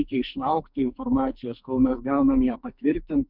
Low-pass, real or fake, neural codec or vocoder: 5.4 kHz; fake; codec, 44.1 kHz, 2.6 kbps, SNAC